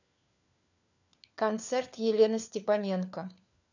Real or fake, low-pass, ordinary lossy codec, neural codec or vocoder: fake; 7.2 kHz; none; codec, 16 kHz, 4 kbps, FunCodec, trained on LibriTTS, 50 frames a second